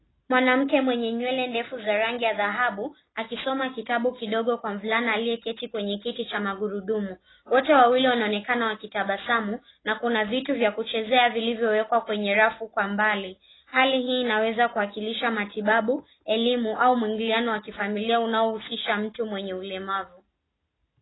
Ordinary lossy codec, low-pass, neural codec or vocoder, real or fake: AAC, 16 kbps; 7.2 kHz; none; real